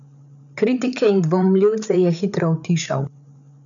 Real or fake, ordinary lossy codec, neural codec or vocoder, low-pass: fake; none; codec, 16 kHz, 8 kbps, FreqCodec, larger model; 7.2 kHz